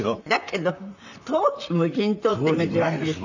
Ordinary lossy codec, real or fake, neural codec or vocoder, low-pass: none; fake; vocoder, 44.1 kHz, 128 mel bands, Pupu-Vocoder; 7.2 kHz